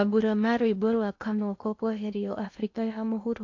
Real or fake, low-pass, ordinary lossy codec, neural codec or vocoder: fake; 7.2 kHz; AAC, 48 kbps; codec, 16 kHz in and 24 kHz out, 0.8 kbps, FocalCodec, streaming, 65536 codes